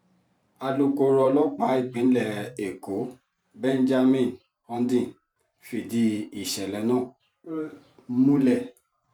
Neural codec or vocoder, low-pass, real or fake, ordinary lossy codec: vocoder, 44.1 kHz, 128 mel bands every 512 samples, BigVGAN v2; 19.8 kHz; fake; none